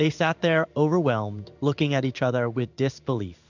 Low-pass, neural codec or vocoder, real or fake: 7.2 kHz; codec, 16 kHz in and 24 kHz out, 1 kbps, XY-Tokenizer; fake